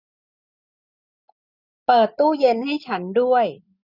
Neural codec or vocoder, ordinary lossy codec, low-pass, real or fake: none; none; 5.4 kHz; real